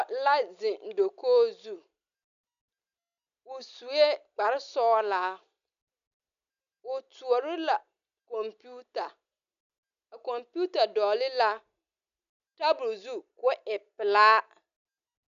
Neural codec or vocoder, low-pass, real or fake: none; 7.2 kHz; real